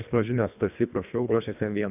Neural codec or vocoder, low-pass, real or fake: codec, 24 kHz, 1.5 kbps, HILCodec; 3.6 kHz; fake